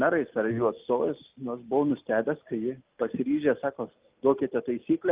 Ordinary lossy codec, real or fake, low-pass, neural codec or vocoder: Opus, 32 kbps; real; 3.6 kHz; none